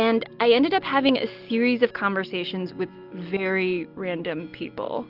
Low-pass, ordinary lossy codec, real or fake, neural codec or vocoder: 5.4 kHz; Opus, 32 kbps; real; none